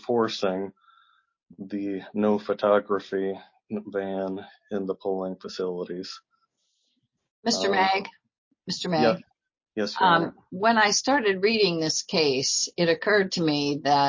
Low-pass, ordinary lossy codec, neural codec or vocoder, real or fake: 7.2 kHz; MP3, 32 kbps; none; real